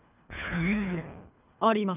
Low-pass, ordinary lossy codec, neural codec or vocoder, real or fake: 3.6 kHz; none; codec, 24 kHz, 3 kbps, HILCodec; fake